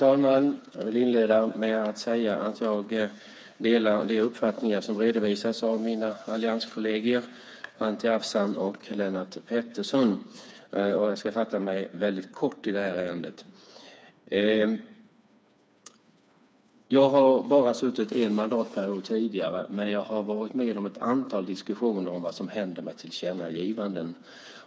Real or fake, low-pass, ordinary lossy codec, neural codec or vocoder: fake; none; none; codec, 16 kHz, 4 kbps, FreqCodec, smaller model